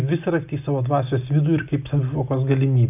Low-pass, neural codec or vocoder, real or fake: 3.6 kHz; none; real